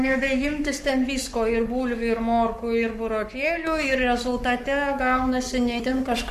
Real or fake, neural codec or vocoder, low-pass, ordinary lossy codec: fake; codec, 44.1 kHz, 7.8 kbps, Pupu-Codec; 14.4 kHz; MP3, 64 kbps